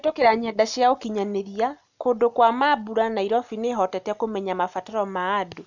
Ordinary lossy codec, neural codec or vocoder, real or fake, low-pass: none; none; real; 7.2 kHz